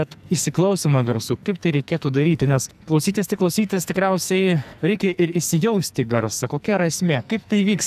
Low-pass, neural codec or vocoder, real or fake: 14.4 kHz; codec, 44.1 kHz, 2.6 kbps, SNAC; fake